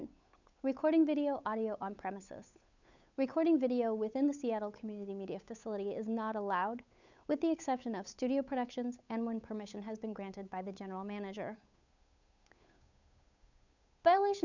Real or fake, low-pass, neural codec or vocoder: fake; 7.2 kHz; codec, 16 kHz, 8 kbps, FunCodec, trained on Chinese and English, 25 frames a second